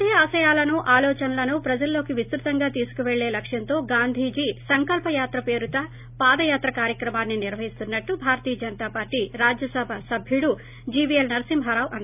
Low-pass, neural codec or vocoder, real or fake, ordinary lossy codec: 3.6 kHz; none; real; none